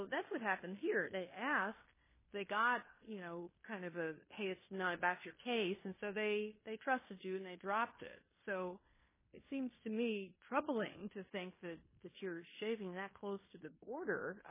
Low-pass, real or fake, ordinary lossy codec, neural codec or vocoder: 3.6 kHz; fake; MP3, 16 kbps; codec, 16 kHz in and 24 kHz out, 0.9 kbps, LongCat-Audio-Codec, fine tuned four codebook decoder